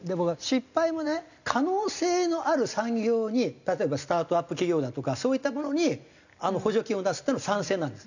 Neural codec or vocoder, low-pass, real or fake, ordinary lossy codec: none; 7.2 kHz; real; none